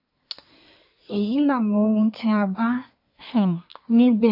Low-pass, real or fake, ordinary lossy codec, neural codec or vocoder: 5.4 kHz; fake; none; codec, 24 kHz, 1 kbps, SNAC